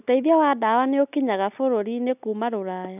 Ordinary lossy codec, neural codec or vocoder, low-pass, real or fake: none; none; 3.6 kHz; real